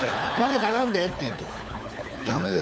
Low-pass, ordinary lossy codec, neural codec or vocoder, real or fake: none; none; codec, 16 kHz, 4 kbps, FunCodec, trained on LibriTTS, 50 frames a second; fake